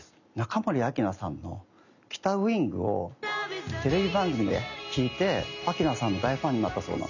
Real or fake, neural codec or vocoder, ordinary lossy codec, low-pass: real; none; none; 7.2 kHz